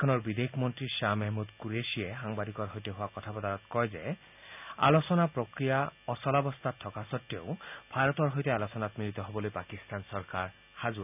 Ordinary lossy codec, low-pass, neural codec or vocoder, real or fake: none; 3.6 kHz; none; real